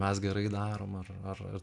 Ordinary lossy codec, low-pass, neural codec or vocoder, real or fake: AAC, 64 kbps; 10.8 kHz; none; real